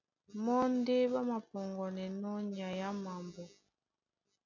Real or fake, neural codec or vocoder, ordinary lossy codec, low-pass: real; none; MP3, 64 kbps; 7.2 kHz